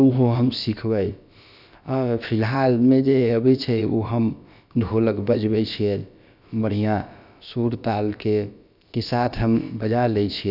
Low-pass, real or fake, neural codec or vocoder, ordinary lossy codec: 5.4 kHz; fake; codec, 16 kHz, about 1 kbps, DyCAST, with the encoder's durations; none